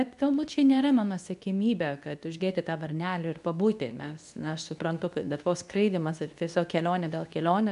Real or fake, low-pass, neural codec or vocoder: fake; 10.8 kHz; codec, 24 kHz, 0.9 kbps, WavTokenizer, medium speech release version 2